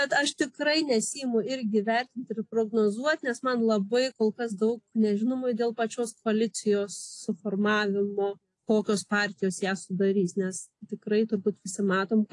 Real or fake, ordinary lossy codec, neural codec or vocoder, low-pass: real; AAC, 48 kbps; none; 10.8 kHz